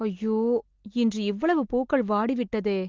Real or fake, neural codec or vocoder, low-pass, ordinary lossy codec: real; none; 7.2 kHz; Opus, 16 kbps